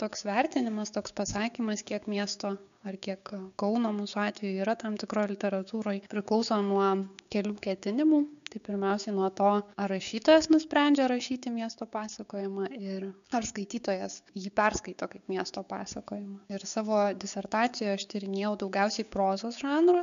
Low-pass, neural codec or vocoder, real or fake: 7.2 kHz; codec, 16 kHz, 6 kbps, DAC; fake